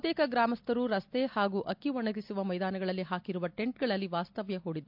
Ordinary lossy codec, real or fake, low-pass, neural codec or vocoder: MP3, 48 kbps; real; 5.4 kHz; none